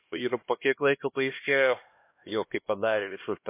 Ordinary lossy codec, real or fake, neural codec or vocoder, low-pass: MP3, 24 kbps; fake; codec, 16 kHz, 1 kbps, X-Codec, HuBERT features, trained on LibriSpeech; 3.6 kHz